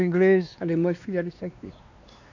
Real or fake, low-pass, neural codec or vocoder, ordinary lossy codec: fake; 7.2 kHz; codec, 16 kHz, 2 kbps, X-Codec, WavLM features, trained on Multilingual LibriSpeech; none